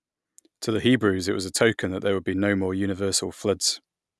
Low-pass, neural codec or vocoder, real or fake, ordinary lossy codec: none; none; real; none